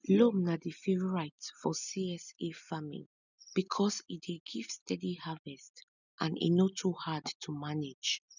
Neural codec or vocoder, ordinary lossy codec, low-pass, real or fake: none; none; 7.2 kHz; real